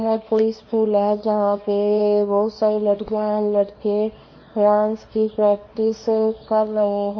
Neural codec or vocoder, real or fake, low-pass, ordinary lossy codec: codec, 24 kHz, 0.9 kbps, WavTokenizer, small release; fake; 7.2 kHz; MP3, 32 kbps